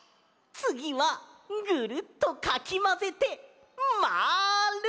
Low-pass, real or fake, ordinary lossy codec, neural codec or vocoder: none; real; none; none